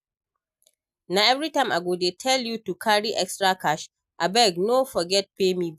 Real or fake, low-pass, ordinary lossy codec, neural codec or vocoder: real; 14.4 kHz; none; none